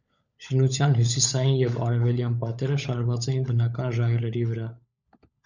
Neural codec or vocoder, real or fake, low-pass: codec, 16 kHz, 16 kbps, FunCodec, trained on LibriTTS, 50 frames a second; fake; 7.2 kHz